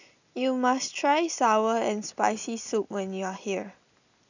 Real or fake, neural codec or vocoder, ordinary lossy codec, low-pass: real; none; none; 7.2 kHz